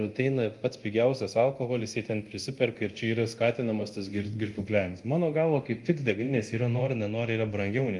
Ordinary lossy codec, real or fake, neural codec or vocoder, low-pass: Opus, 32 kbps; fake; codec, 24 kHz, 0.9 kbps, DualCodec; 10.8 kHz